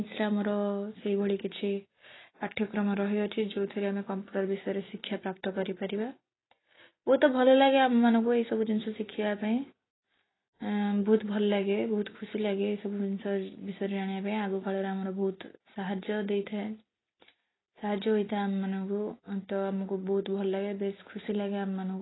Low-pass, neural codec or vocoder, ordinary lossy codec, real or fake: 7.2 kHz; none; AAC, 16 kbps; real